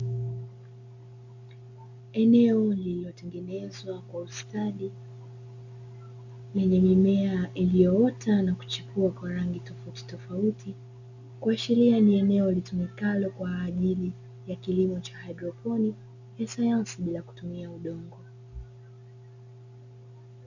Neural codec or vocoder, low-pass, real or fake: none; 7.2 kHz; real